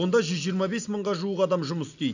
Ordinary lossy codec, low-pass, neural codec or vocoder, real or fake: none; 7.2 kHz; none; real